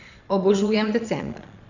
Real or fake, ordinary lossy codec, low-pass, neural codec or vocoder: fake; none; 7.2 kHz; vocoder, 22.05 kHz, 80 mel bands, Vocos